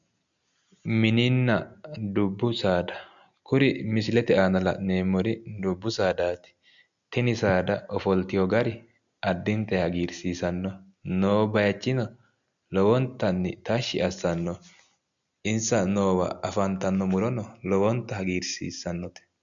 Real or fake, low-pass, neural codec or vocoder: real; 7.2 kHz; none